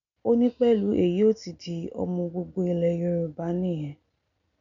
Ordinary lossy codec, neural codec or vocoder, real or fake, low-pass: none; none; real; 7.2 kHz